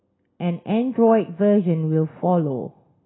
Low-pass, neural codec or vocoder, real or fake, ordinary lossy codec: 7.2 kHz; none; real; AAC, 16 kbps